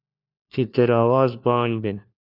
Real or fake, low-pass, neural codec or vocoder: fake; 5.4 kHz; codec, 16 kHz, 1 kbps, FunCodec, trained on LibriTTS, 50 frames a second